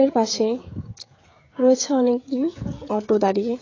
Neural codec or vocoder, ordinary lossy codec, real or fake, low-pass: codec, 16 kHz, 16 kbps, FreqCodec, smaller model; AAC, 32 kbps; fake; 7.2 kHz